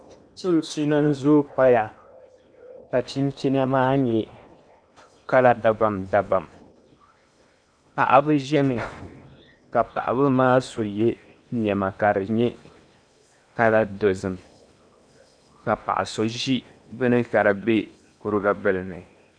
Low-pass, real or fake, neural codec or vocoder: 9.9 kHz; fake; codec, 16 kHz in and 24 kHz out, 0.8 kbps, FocalCodec, streaming, 65536 codes